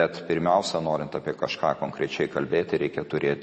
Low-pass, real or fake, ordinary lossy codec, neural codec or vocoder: 10.8 kHz; real; MP3, 32 kbps; none